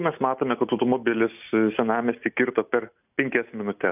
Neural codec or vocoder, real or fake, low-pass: none; real; 3.6 kHz